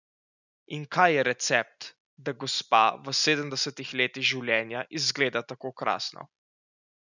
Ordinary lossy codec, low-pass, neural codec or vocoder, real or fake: none; 7.2 kHz; none; real